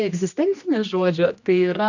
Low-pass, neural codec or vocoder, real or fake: 7.2 kHz; codec, 16 kHz, 1 kbps, X-Codec, HuBERT features, trained on general audio; fake